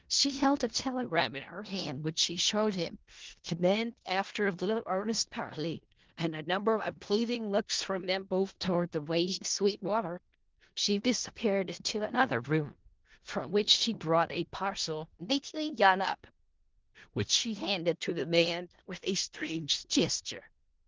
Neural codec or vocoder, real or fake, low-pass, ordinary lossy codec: codec, 16 kHz in and 24 kHz out, 0.4 kbps, LongCat-Audio-Codec, four codebook decoder; fake; 7.2 kHz; Opus, 16 kbps